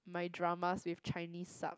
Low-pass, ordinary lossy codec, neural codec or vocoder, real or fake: none; none; none; real